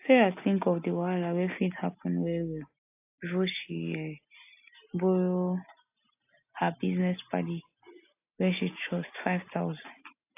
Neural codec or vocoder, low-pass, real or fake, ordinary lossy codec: none; 3.6 kHz; real; AAC, 32 kbps